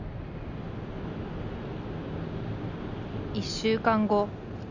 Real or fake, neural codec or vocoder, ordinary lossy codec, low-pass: real; none; none; 7.2 kHz